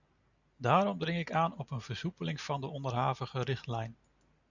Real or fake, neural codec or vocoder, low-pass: real; none; 7.2 kHz